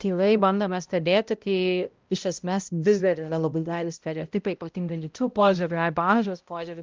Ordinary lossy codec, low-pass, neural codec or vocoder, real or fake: Opus, 32 kbps; 7.2 kHz; codec, 16 kHz, 0.5 kbps, X-Codec, HuBERT features, trained on balanced general audio; fake